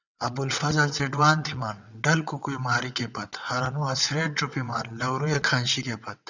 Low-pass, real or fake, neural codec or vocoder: 7.2 kHz; fake; vocoder, 22.05 kHz, 80 mel bands, WaveNeXt